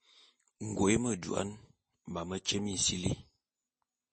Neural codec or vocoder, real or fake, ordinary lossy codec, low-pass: none; real; MP3, 32 kbps; 10.8 kHz